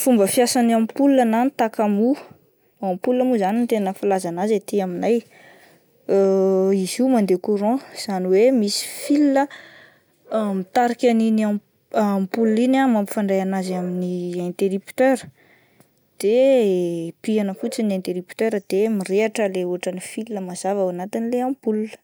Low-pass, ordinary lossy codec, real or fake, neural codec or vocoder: none; none; real; none